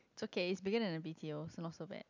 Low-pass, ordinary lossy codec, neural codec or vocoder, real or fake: 7.2 kHz; none; none; real